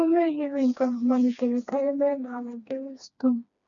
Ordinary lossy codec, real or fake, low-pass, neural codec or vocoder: none; fake; 7.2 kHz; codec, 16 kHz, 2 kbps, FreqCodec, smaller model